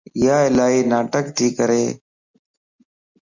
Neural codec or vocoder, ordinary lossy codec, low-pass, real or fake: none; Opus, 64 kbps; 7.2 kHz; real